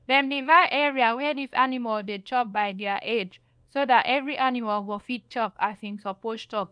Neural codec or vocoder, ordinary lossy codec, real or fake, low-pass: codec, 24 kHz, 0.9 kbps, WavTokenizer, small release; none; fake; 9.9 kHz